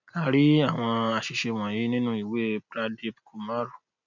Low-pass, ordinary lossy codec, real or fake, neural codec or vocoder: 7.2 kHz; none; real; none